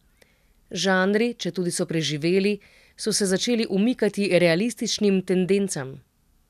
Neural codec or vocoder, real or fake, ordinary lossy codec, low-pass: none; real; none; 14.4 kHz